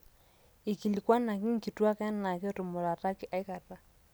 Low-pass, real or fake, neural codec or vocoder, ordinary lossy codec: none; real; none; none